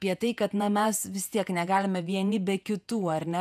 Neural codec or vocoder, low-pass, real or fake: vocoder, 48 kHz, 128 mel bands, Vocos; 14.4 kHz; fake